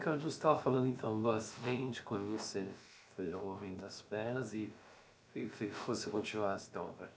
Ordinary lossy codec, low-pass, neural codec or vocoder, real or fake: none; none; codec, 16 kHz, about 1 kbps, DyCAST, with the encoder's durations; fake